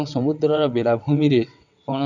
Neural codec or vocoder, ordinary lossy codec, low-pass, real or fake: vocoder, 22.05 kHz, 80 mel bands, WaveNeXt; none; 7.2 kHz; fake